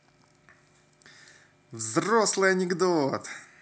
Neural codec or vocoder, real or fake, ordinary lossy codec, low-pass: none; real; none; none